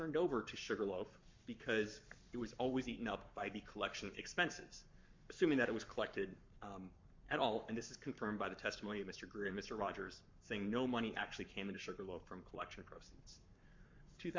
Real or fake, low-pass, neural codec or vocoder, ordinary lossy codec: fake; 7.2 kHz; codec, 44.1 kHz, 7.8 kbps, Pupu-Codec; MP3, 48 kbps